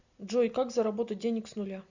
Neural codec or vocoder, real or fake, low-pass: none; real; 7.2 kHz